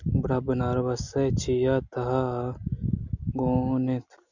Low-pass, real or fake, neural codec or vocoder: 7.2 kHz; real; none